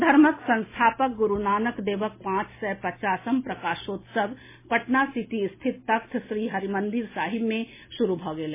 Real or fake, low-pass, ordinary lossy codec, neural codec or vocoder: real; 3.6 kHz; MP3, 16 kbps; none